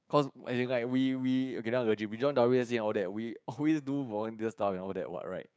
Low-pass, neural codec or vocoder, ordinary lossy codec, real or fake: none; codec, 16 kHz, 6 kbps, DAC; none; fake